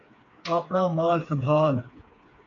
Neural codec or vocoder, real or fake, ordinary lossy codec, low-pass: codec, 16 kHz, 4 kbps, FreqCodec, smaller model; fake; AAC, 64 kbps; 7.2 kHz